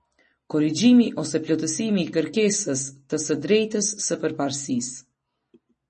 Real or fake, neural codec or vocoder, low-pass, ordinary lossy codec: real; none; 10.8 kHz; MP3, 32 kbps